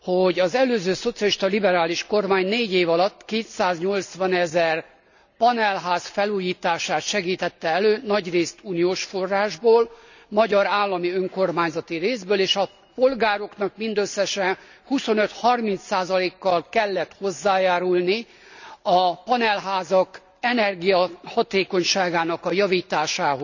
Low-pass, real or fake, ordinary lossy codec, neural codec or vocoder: 7.2 kHz; real; none; none